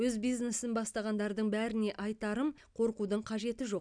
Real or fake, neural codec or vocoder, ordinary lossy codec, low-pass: real; none; none; 9.9 kHz